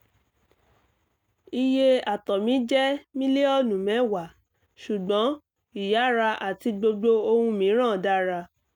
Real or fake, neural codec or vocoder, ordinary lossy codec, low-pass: real; none; none; 19.8 kHz